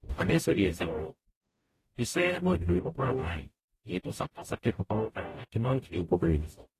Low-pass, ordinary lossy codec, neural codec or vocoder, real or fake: 14.4 kHz; AAC, 48 kbps; codec, 44.1 kHz, 0.9 kbps, DAC; fake